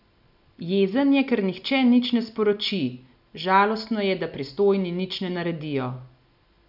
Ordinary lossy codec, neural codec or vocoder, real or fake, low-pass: none; none; real; 5.4 kHz